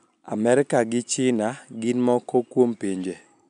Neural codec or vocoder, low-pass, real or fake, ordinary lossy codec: none; 9.9 kHz; real; none